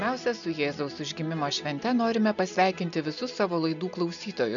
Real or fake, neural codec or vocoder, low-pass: real; none; 7.2 kHz